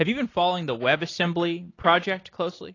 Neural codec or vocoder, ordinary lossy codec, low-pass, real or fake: none; AAC, 32 kbps; 7.2 kHz; real